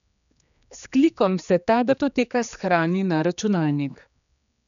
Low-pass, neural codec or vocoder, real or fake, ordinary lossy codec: 7.2 kHz; codec, 16 kHz, 2 kbps, X-Codec, HuBERT features, trained on general audio; fake; none